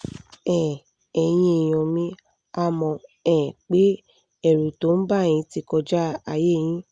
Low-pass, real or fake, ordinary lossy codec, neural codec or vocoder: 9.9 kHz; real; Opus, 64 kbps; none